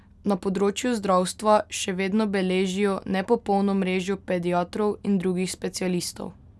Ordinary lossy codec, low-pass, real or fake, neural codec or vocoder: none; none; real; none